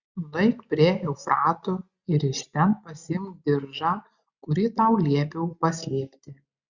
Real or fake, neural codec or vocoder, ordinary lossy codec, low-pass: real; none; Opus, 64 kbps; 7.2 kHz